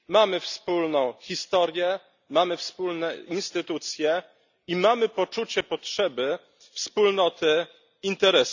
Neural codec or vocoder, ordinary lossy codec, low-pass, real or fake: none; none; 7.2 kHz; real